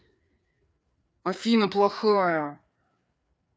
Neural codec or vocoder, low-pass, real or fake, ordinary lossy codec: codec, 16 kHz, 4 kbps, FreqCodec, larger model; none; fake; none